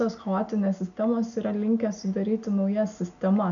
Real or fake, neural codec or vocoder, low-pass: real; none; 7.2 kHz